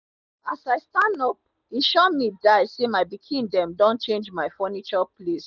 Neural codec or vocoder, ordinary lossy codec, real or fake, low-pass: none; none; real; 7.2 kHz